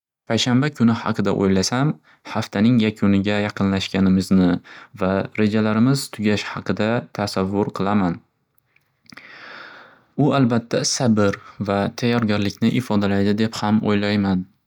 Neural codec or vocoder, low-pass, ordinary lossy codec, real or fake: none; 19.8 kHz; none; real